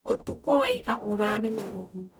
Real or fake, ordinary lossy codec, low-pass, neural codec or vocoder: fake; none; none; codec, 44.1 kHz, 0.9 kbps, DAC